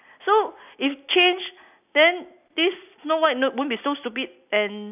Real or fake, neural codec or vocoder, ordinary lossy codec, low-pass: real; none; none; 3.6 kHz